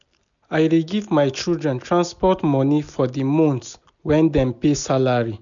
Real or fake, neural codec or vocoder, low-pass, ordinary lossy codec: real; none; 7.2 kHz; none